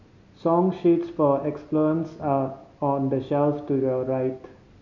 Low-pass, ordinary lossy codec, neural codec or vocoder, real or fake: 7.2 kHz; none; none; real